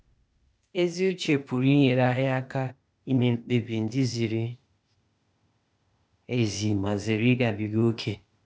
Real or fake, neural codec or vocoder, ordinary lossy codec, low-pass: fake; codec, 16 kHz, 0.8 kbps, ZipCodec; none; none